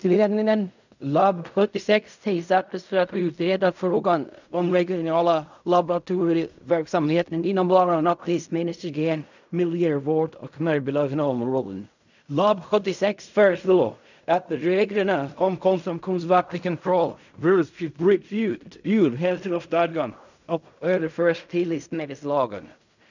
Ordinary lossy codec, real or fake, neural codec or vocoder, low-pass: none; fake; codec, 16 kHz in and 24 kHz out, 0.4 kbps, LongCat-Audio-Codec, fine tuned four codebook decoder; 7.2 kHz